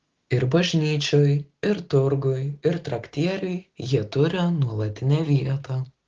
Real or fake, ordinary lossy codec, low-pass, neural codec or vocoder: real; Opus, 16 kbps; 7.2 kHz; none